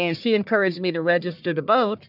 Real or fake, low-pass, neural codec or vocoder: fake; 5.4 kHz; codec, 44.1 kHz, 1.7 kbps, Pupu-Codec